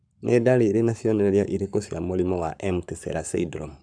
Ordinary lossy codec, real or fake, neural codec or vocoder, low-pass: none; fake; codec, 44.1 kHz, 7.8 kbps, Pupu-Codec; 9.9 kHz